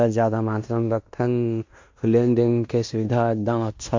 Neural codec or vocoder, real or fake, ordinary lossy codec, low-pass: codec, 16 kHz in and 24 kHz out, 0.9 kbps, LongCat-Audio-Codec, fine tuned four codebook decoder; fake; MP3, 48 kbps; 7.2 kHz